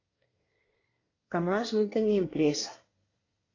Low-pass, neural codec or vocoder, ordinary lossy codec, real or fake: 7.2 kHz; codec, 24 kHz, 1 kbps, SNAC; AAC, 32 kbps; fake